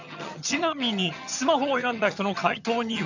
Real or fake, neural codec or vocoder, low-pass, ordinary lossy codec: fake; vocoder, 22.05 kHz, 80 mel bands, HiFi-GAN; 7.2 kHz; none